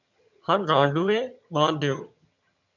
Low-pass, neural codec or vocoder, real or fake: 7.2 kHz; vocoder, 22.05 kHz, 80 mel bands, HiFi-GAN; fake